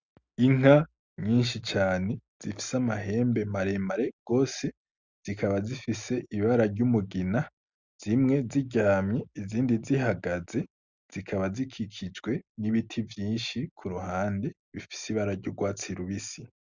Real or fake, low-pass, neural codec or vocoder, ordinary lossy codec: real; 7.2 kHz; none; Opus, 64 kbps